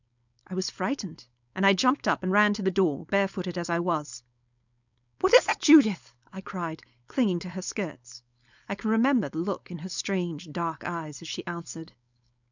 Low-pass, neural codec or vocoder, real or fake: 7.2 kHz; codec, 16 kHz, 4.8 kbps, FACodec; fake